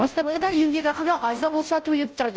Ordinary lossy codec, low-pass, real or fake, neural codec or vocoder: none; none; fake; codec, 16 kHz, 0.5 kbps, FunCodec, trained on Chinese and English, 25 frames a second